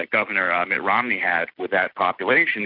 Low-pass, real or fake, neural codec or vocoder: 5.4 kHz; real; none